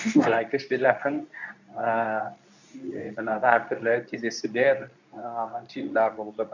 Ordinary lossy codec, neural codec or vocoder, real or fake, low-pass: none; codec, 24 kHz, 0.9 kbps, WavTokenizer, medium speech release version 2; fake; 7.2 kHz